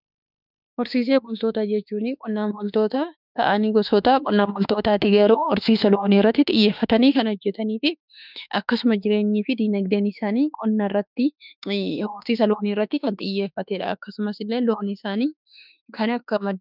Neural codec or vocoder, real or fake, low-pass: autoencoder, 48 kHz, 32 numbers a frame, DAC-VAE, trained on Japanese speech; fake; 5.4 kHz